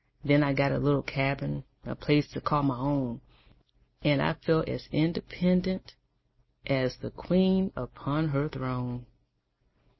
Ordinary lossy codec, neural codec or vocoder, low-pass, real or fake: MP3, 24 kbps; none; 7.2 kHz; real